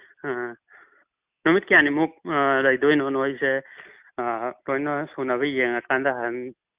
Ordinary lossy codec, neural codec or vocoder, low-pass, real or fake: Opus, 64 kbps; none; 3.6 kHz; real